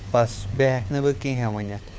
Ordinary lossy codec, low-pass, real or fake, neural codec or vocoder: none; none; fake; codec, 16 kHz, 4 kbps, FunCodec, trained on LibriTTS, 50 frames a second